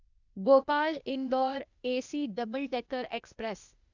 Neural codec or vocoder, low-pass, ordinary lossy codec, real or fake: codec, 16 kHz, 0.8 kbps, ZipCodec; 7.2 kHz; none; fake